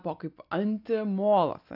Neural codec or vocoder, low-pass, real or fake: none; 5.4 kHz; real